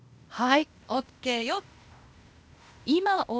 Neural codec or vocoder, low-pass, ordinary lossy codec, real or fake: codec, 16 kHz, 0.8 kbps, ZipCodec; none; none; fake